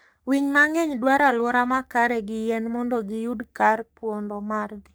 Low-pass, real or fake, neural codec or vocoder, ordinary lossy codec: none; fake; codec, 44.1 kHz, 3.4 kbps, Pupu-Codec; none